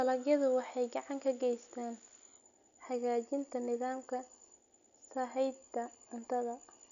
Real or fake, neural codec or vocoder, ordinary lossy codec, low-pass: real; none; MP3, 96 kbps; 7.2 kHz